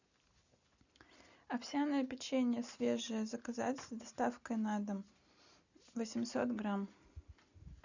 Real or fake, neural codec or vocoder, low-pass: real; none; 7.2 kHz